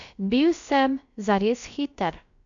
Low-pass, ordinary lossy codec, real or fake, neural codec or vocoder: 7.2 kHz; MP3, 64 kbps; fake; codec, 16 kHz, about 1 kbps, DyCAST, with the encoder's durations